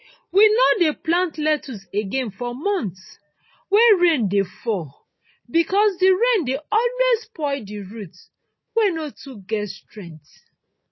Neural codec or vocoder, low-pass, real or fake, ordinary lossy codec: none; 7.2 kHz; real; MP3, 24 kbps